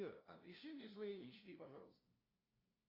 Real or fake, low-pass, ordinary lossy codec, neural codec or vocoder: fake; 5.4 kHz; Opus, 64 kbps; codec, 16 kHz, 0.5 kbps, FunCodec, trained on LibriTTS, 25 frames a second